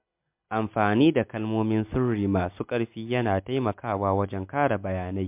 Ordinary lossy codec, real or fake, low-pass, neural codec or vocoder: MP3, 32 kbps; real; 3.6 kHz; none